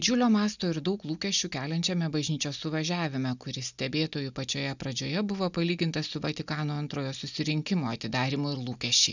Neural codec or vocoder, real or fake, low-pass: none; real; 7.2 kHz